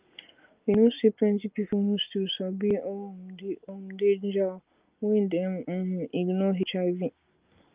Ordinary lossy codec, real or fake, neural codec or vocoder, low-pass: Opus, 24 kbps; real; none; 3.6 kHz